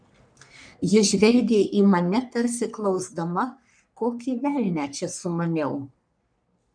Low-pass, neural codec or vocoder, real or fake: 9.9 kHz; codec, 44.1 kHz, 3.4 kbps, Pupu-Codec; fake